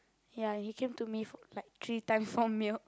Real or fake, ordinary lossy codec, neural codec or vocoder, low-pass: real; none; none; none